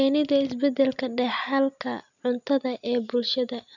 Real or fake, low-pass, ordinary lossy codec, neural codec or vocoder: real; 7.2 kHz; none; none